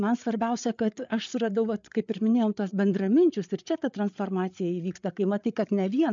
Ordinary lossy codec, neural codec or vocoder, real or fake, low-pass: MP3, 64 kbps; codec, 16 kHz, 8 kbps, FreqCodec, larger model; fake; 7.2 kHz